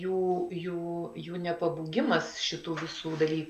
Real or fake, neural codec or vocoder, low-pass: real; none; 14.4 kHz